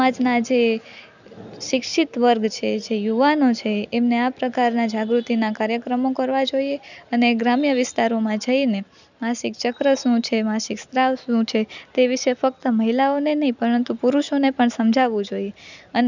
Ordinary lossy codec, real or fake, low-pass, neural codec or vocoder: none; real; 7.2 kHz; none